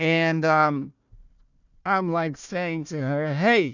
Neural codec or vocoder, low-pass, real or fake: codec, 16 kHz, 1 kbps, FunCodec, trained on Chinese and English, 50 frames a second; 7.2 kHz; fake